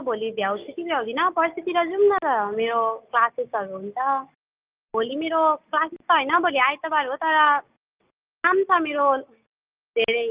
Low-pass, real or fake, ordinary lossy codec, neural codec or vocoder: 3.6 kHz; real; Opus, 24 kbps; none